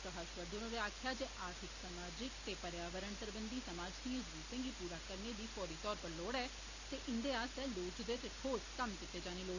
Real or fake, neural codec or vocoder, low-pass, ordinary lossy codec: real; none; 7.2 kHz; none